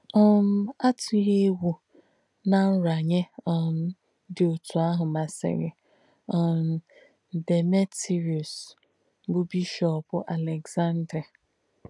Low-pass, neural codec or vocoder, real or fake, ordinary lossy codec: 10.8 kHz; none; real; none